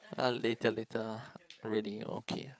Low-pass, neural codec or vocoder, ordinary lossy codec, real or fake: none; codec, 16 kHz, 8 kbps, FreqCodec, larger model; none; fake